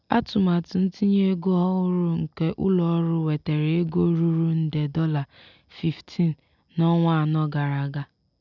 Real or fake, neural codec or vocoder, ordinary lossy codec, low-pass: real; none; none; 7.2 kHz